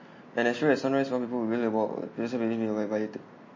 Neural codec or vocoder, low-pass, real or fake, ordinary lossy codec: none; 7.2 kHz; real; MP3, 32 kbps